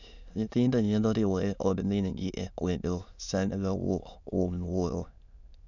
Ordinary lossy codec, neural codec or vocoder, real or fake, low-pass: none; autoencoder, 22.05 kHz, a latent of 192 numbers a frame, VITS, trained on many speakers; fake; 7.2 kHz